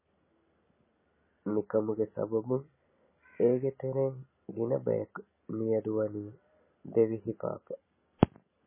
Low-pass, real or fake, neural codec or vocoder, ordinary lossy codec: 3.6 kHz; real; none; MP3, 16 kbps